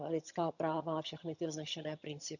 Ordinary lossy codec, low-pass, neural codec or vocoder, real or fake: none; 7.2 kHz; vocoder, 22.05 kHz, 80 mel bands, HiFi-GAN; fake